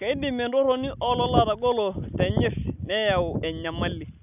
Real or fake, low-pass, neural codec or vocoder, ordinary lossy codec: real; 3.6 kHz; none; none